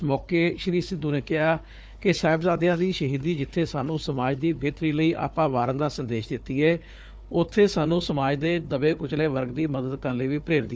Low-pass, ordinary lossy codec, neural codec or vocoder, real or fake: none; none; codec, 16 kHz, 4 kbps, FunCodec, trained on Chinese and English, 50 frames a second; fake